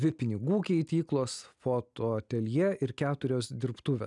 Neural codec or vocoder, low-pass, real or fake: none; 10.8 kHz; real